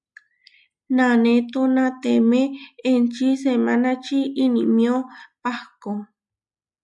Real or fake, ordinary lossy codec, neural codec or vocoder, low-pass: real; MP3, 96 kbps; none; 10.8 kHz